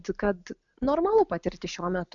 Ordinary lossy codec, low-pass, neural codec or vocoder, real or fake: MP3, 96 kbps; 7.2 kHz; none; real